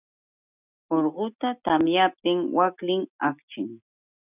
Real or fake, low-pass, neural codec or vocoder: real; 3.6 kHz; none